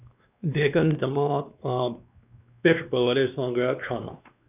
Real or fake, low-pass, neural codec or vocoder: fake; 3.6 kHz; codec, 16 kHz, 2 kbps, X-Codec, WavLM features, trained on Multilingual LibriSpeech